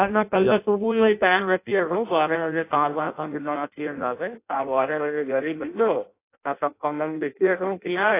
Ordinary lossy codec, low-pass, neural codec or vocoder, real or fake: AAC, 24 kbps; 3.6 kHz; codec, 16 kHz in and 24 kHz out, 0.6 kbps, FireRedTTS-2 codec; fake